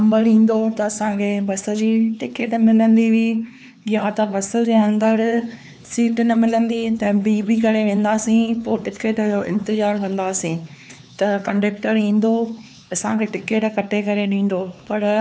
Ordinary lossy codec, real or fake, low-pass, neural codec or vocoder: none; fake; none; codec, 16 kHz, 4 kbps, X-Codec, HuBERT features, trained on LibriSpeech